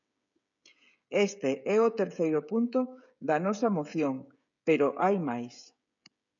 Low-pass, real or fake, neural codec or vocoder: 7.2 kHz; fake; codec, 16 kHz, 16 kbps, FreqCodec, smaller model